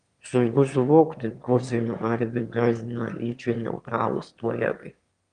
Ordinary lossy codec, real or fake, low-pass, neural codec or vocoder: Opus, 32 kbps; fake; 9.9 kHz; autoencoder, 22.05 kHz, a latent of 192 numbers a frame, VITS, trained on one speaker